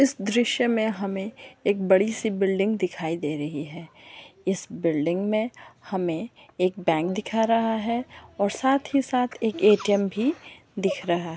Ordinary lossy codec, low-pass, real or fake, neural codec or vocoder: none; none; real; none